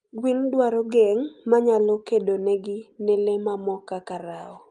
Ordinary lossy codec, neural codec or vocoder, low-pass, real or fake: Opus, 32 kbps; none; 10.8 kHz; real